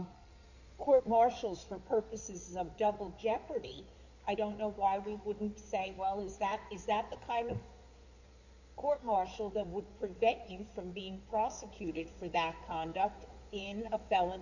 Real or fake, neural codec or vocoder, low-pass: fake; codec, 16 kHz in and 24 kHz out, 2.2 kbps, FireRedTTS-2 codec; 7.2 kHz